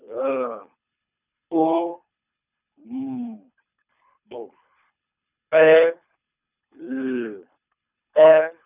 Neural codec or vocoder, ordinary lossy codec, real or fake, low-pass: codec, 24 kHz, 3 kbps, HILCodec; none; fake; 3.6 kHz